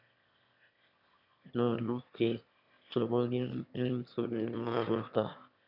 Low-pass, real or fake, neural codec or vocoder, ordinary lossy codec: 5.4 kHz; fake; autoencoder, 22.05 kHz, a latent of 192 numbers a frame, VITS, trained on one speaker; none